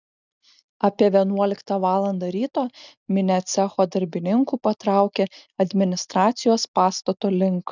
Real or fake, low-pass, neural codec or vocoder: real; 7.2 kHz; none